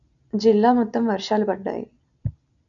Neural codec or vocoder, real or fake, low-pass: none; real; 7.2 kHz